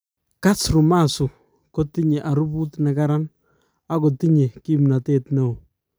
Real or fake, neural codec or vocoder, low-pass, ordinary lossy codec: real; none; none; none